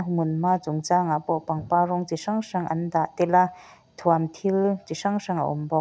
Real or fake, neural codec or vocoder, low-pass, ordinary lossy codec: real; none; none; none